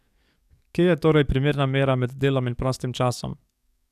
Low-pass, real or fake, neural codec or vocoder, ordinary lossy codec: 14.4 kHz; fake; codec, 44.1 kHz, 7.8 kbps, DAC; none